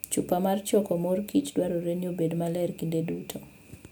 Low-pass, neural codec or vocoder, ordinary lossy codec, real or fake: none; none; none; real